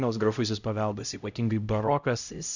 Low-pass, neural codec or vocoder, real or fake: 7.2 kHz; codec, 16 kHz, 0.5 kbps, X-Codec, HuBERT features, trained on LibriSpeech; fake